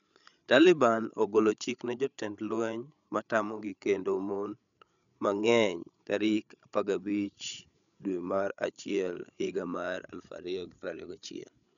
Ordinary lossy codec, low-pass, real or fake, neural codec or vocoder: none; 7.2 kHz; fake; codec, 16 kHz, 8 kbps, FreqCodec, larger model